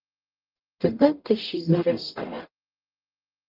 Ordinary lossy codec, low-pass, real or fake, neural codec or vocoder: Opus, 32 kbps; 5.4 kHz; fake; codec, 44.1 kHz, 0.9 kbps, DAC